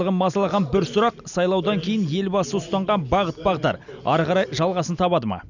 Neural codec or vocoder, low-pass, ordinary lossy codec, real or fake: none; 7.2 kHz; none; real